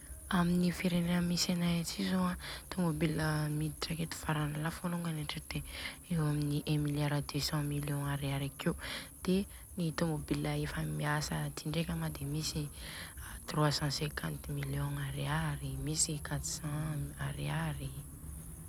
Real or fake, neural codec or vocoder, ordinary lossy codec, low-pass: real; none; none; none